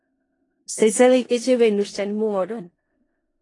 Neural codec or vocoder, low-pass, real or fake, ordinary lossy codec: codec, 16 kHz in and 24 kHz out, 0.4 kbps, LongCat-Audio-Codec, four codebook decoder; 10.8 kHz; fake; AAC, 32 kbps